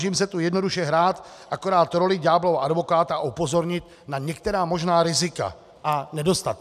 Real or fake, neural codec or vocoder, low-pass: real; none; 14.4 kHz